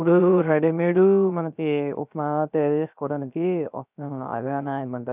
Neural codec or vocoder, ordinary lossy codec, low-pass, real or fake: codec, 16 kHz, 0.3 kbps, FocalCodec; none; 3.6 kHz; fake